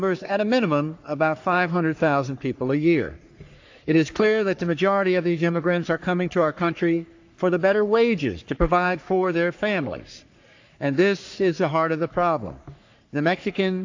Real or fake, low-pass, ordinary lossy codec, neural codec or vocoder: fake; 7.2 kHz; AAC, 48 kbps; codec, 44.1 kHz, 3.4 kbps, Pupu-Codec